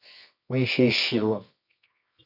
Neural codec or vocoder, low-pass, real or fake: codec, 24 kHz, 0.9 kbps, WavTokenizer, medium music audio release; 5.4 kHz; fake